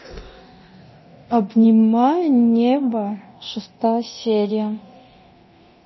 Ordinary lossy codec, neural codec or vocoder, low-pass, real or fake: MP3, 24 kbps; codec, 24 kHz, 0.9 kbps, DualCodec; 7.2 kHz; fake